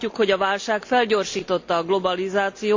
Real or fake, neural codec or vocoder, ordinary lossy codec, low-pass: real; none; MP3, 48 kbps; 7.2 kHz